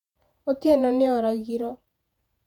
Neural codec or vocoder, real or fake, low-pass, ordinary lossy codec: vocoder, 48 kHz, 128 mel bands, Vocos; fake; 19.8 kHz; none